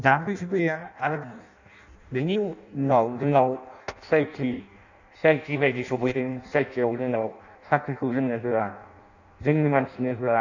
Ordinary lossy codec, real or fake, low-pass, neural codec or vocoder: none; fake; 7.2 kHz; codec, 16 kHz in and 24 kHz out, 0.6 kbps, FireRedTTS-2 codec